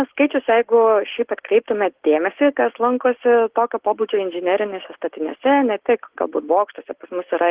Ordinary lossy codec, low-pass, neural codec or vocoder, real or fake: Opus, 16 kbps; 3.6 kHz; none; real